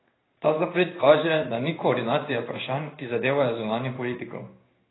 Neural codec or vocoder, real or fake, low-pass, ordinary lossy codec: codec, 16 kHz in and 24 kHz out, 1 kbps, XY-Tokenizer; fake; 7.2 kHz; AAC, 16 kbps